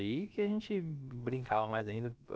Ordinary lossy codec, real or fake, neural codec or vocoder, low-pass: none; fake; codec, 16 kHz, about 1 kbps, DyCAST, with the encoder's durations; none